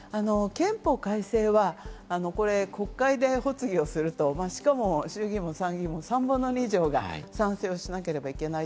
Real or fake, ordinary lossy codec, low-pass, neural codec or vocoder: real; none; none; none